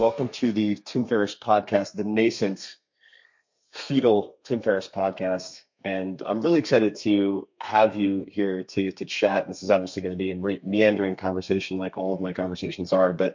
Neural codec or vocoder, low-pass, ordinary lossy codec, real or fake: codec, 32 kHz, 1.9 kbps, SNAC; 7.2 kHz; MP3, 48 kbps; fake